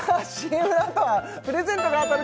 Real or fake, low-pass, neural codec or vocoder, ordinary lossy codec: real; none; none; none